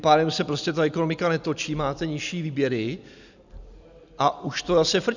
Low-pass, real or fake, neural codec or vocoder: 7.2 kHz; real; none